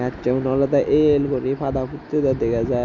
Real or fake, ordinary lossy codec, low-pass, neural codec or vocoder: real; none; 7.2 kHz; none